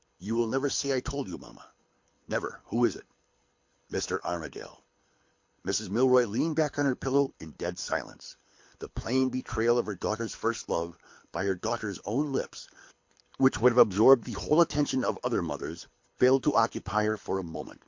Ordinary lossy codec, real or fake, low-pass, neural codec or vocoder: MP3, 48 kbps; fake; 7.2 kHz; codec, 24 kHz, 6 kbps, HILCodec